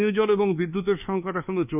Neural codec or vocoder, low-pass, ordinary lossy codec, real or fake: codec, 24 kHz, 1.2 kbps, DualCodec; 3.6 kHz; none; fake